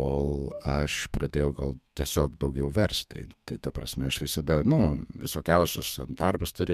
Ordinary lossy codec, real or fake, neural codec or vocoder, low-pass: MP3, 96 kbps; fake; codec, 32 kHz, 1.9 kbps, SNAC; 14.4 kHz